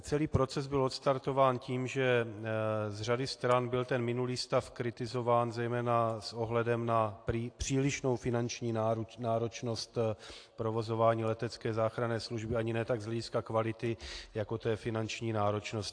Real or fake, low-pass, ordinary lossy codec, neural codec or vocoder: real; 9.9 kHz; AAC, 48 kbps; none